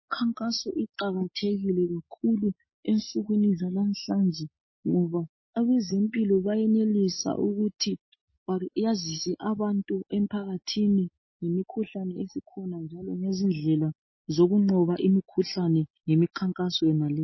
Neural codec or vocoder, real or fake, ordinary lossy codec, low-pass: none; real; MP3, 24 kbps; 7.2 kHz